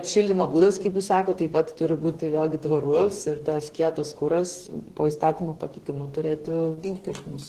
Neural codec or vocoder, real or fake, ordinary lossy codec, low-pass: codec, 44.1 kHz, 2.6 kbps, DAC; fake; Opus, 16 kbps; 14.4 kHz